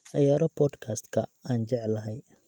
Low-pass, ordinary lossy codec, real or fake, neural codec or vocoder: 19.8 kHz; Opus, 32 kbps; real; none